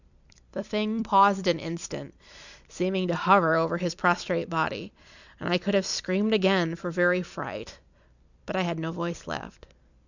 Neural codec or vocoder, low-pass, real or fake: vocoder, 44.1 kHz, 128 mel bands every 256 samples, BigVGAN v2; 7.2 kHz; fake